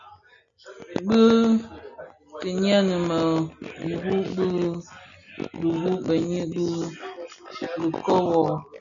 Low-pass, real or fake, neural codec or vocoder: 7.2 kHz; real; none